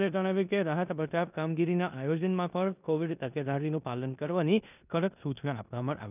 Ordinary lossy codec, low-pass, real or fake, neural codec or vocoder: none; 3.6 kHz; fake; codec, 16 kHz in and 24 kHz out, 0.9 kbps, LongCat-Audio-Codec, four codebook decoder